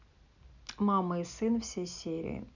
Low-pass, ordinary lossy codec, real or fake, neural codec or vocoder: 7.2 kHz; none; real; none